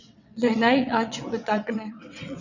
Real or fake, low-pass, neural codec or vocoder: fake; 7.2 kHz; vocoder, 22.05 kHz, 80 mel bands, WaveNeXt